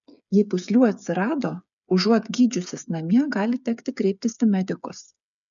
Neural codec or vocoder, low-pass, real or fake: codec, 16 kHz, 8 kbps, FreqCodec, smaller model; 7.2 kHz; fake